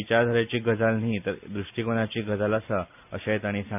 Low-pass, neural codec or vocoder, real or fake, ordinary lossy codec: 3.6 kHz; none; real; none